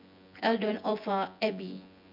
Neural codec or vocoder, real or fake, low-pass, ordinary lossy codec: vocoder, 24 kHz, 100 mel bands, Vocos; fake; 5.4 kHz; MP3, 48 kbps